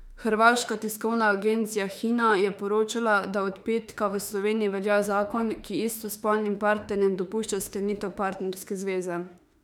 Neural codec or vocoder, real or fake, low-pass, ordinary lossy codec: autoencoder, 48 kHz, 32 numbers a frame, DAC-VAE, trained on Japanese speech; fake; 19.8 kHz; none